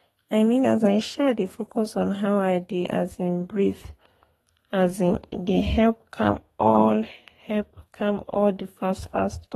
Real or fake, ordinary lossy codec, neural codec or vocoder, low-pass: fake; AAC, 32 kbps; codec, 32 kHz, 1.9 kbps, SNAC; 14.4 kHz